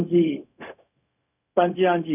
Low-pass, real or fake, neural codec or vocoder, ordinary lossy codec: 3.6 kHz; fake; codec, 16 kHz, 0.4 kbps, LongCat-Audio-Codec; none